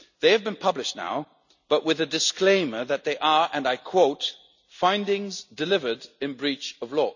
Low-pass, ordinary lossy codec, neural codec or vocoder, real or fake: 7.2 kHz; none; none; real